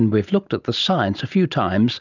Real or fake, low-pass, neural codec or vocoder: real; 7.2 kHz; none